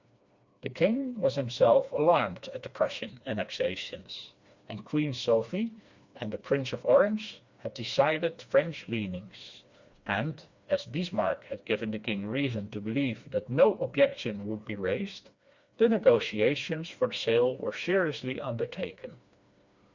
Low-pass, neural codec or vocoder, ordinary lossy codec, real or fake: 7.2 kHz; codec, 16 kHz, 2 kbps, FreqCodec, smaller model; Opus, 64 kbps; fake